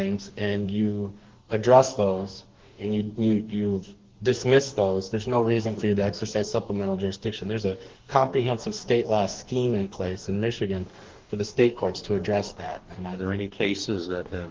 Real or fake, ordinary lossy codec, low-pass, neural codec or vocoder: fake; Opus, 16 kbps; 7.2 kHz; codec, 44.1 kHz, 2.6 kbps, DAC